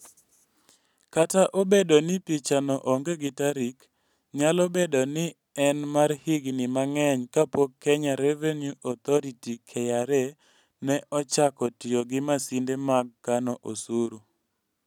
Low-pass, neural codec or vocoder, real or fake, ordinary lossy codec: 19.8 kHz; none; real; none